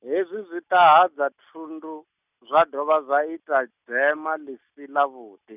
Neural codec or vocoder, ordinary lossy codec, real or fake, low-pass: none; none; real; 3.6 kHz